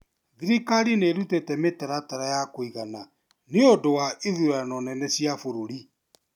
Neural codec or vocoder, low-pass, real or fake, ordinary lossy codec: none; 19.8 kHz; real; none